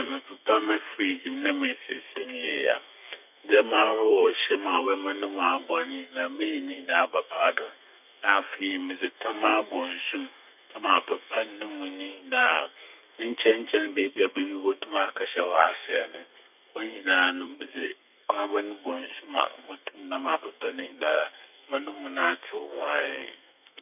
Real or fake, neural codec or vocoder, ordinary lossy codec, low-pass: fake; codec, 32 kHz, 1.9 kbps, SNAC; none; 3.6 kHz